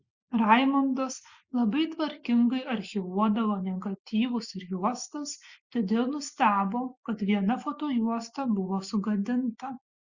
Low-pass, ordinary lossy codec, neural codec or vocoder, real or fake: 7.2 kHz; Opus, 64 kbps; none; real